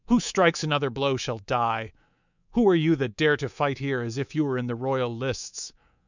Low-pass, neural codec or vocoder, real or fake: 7.2 kHz; codec, 24 kHz, 3.1 kbps, DualCodec; fake